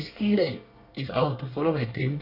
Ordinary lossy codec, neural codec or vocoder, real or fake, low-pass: none; codec, 24 kHz, 1 kbps, SNAC; fake; 5.4 kHz